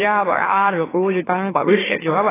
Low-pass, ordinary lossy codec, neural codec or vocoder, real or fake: 3.6 kHz; AAC, 16 kbps; autoencoder, 44.1 kHz, a latent of 192 numbers a frame, MeloTTS; fake